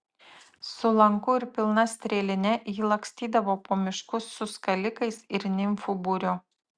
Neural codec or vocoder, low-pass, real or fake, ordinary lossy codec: none; 9.9 kHz; real; Opus, 64 kbps